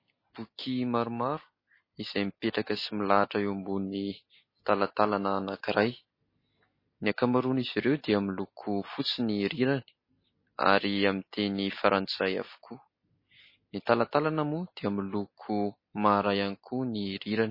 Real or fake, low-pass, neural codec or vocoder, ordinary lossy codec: real; 5.4 kHz; none; MP3, 24 kbps